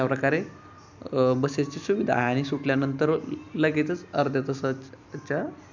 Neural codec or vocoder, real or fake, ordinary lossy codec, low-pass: none; real; none; 7.2 kHz